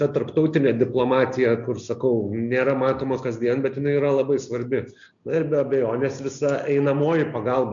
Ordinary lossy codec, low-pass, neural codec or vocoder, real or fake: MP3, 64 kbps; 7.2 kHz; none; real